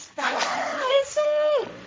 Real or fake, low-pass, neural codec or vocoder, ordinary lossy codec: fake; none; codec, 16 kHz, 1.1 kbps, Voila-Tokenizer; none